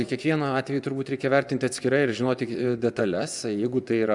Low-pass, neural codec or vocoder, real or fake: 10.8 kHz; vocoder, 24 kHz, 100 mel bands, Vocos; fake